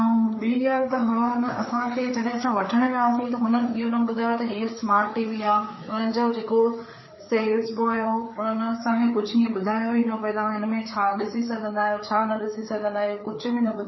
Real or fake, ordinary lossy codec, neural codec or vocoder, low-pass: fake; MP3, 24 kbps; codec, 16 kHz, 4 kbps, FreqCodec, larger model; 7.2 kHz